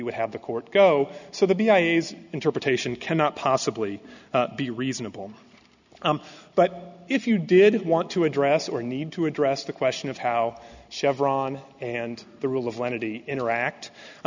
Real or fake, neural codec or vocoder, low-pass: real; none; 7.2 kHz